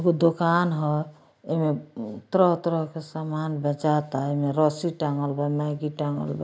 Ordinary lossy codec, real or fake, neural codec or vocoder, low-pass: none; real; none; none